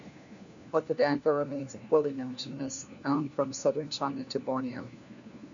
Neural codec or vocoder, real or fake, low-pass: codec, 16 kHz, 1 kbps, FunCodec, trained on LibriTTS, 50 frames a second; fake; 7.2 kHz